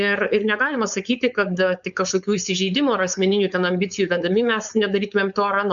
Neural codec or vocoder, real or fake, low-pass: codec, 16 kHz, 4.8 kbps, FACodec; fake; 7.2 kHz